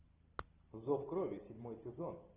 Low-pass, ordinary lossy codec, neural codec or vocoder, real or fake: 3.6 kHz; Opus, 24 kbps; none; real